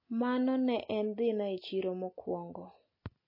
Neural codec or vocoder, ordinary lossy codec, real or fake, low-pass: none; MP3, 24 kbps; real; 5.4 kHz